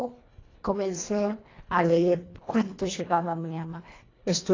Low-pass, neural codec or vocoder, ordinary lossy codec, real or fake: 7.2 kHz; codec, 24 kHz, 1.5 kbps, HILCodec; AAC, 32 kbps; fake